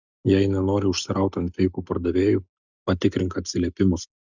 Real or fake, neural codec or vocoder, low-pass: real; none; 7.2 kHz